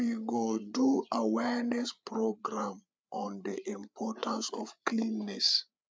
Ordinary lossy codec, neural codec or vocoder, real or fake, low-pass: none; codec, 16 kHz, 8 kbps, FreqCodec, larger model; fake; none